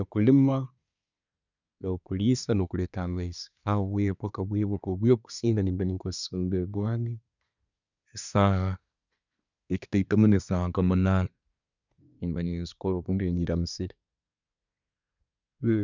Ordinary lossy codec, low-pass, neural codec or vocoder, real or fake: none; 7.2 kHz; codec, 24 kHz, 1 kbps, SNAC; fake